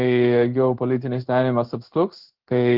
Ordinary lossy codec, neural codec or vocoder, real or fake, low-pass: Opus, 16 kbps; codec, 16 kHz in and 24 kHz out, 1 kbps, XY-Tokenizer; fake; 5.4 kHz